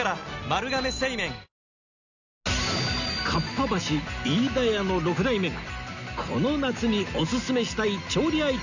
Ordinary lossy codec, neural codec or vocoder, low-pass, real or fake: none; none; 7.2 kHz; real